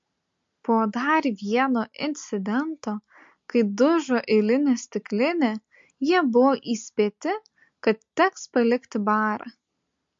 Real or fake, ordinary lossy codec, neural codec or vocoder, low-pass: real; MP3, 48 kbps; none; 7.2 kHz